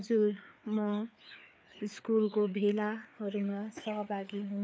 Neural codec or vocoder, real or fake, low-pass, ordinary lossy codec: codec, 16 kHz, 4 kbps, FreqCodec, larger model; fake; none; none